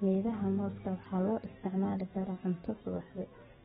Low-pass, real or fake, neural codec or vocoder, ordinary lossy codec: 19.8 kHz; fake; codec, 44.1 kHz, 7.8 kbps, DAC; AAC, 16 kbps